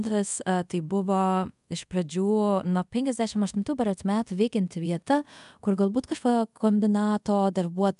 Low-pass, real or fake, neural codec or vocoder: 10.8 kHz; fake; codec, 24 kHz, 0.5 kbps, DualCodec